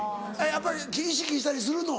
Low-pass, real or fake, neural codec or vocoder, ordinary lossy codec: none; real; none; none